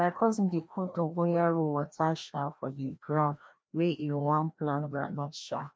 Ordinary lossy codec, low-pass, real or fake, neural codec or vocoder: none; none; fake; codec, 16 kHz, 1 kbps, FreqCodec, larger model